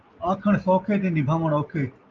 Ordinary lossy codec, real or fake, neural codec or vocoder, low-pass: Opus, 24 kbps; real; none; 7.2 kHz